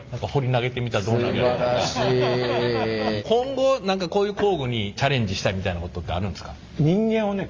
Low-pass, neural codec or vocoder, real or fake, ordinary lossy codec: 7.2 kHz; none; real; Opus, 32 kbps